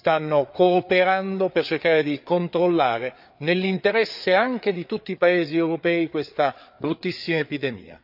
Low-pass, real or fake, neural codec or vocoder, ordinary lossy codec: 5.4 kHz; fake; codec, 16 kHz, 4 kbps, FreqCodec, larger model; none